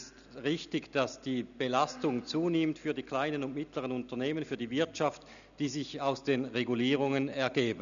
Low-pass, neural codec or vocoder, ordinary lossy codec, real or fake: 7.2 kHz; none; none; real